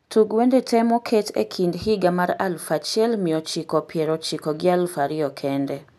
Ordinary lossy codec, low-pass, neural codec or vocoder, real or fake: none; 14.4 kHz; none; real